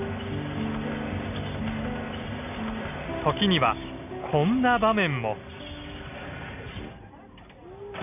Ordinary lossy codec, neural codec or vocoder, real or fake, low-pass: none; none; real; 3.6 kHz